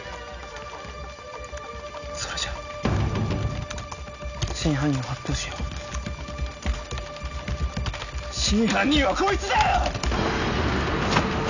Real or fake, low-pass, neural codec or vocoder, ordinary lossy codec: real; 7.2 kHz; none; none